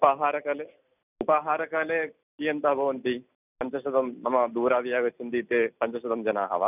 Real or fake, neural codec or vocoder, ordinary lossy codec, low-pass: real; none; none; 3.6 kHz